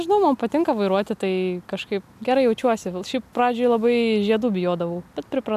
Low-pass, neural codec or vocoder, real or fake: 14.4 kHz; none; real